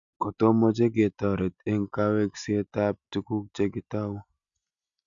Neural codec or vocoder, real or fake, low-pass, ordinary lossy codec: none; real; 7.2 kHz; none